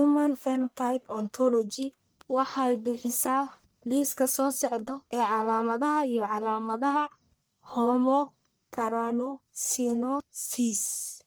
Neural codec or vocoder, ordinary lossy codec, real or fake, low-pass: codec, 44.1 kHz, 1.7 kbps, Pupu-Codec; none; fake; none